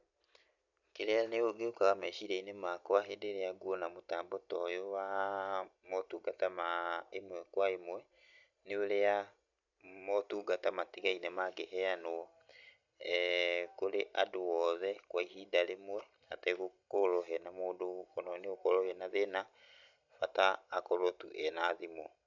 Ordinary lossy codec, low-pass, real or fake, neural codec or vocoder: none; 7.2 kHz; real; none